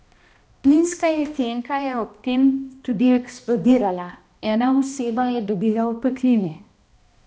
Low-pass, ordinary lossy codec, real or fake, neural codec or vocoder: none; none; fake; codec, 16 kHz, 1 kbps, X-Codec, HuBERT features, trained on balanced general audio